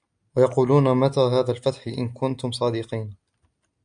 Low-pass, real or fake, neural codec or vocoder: 9.9 kHz; real; none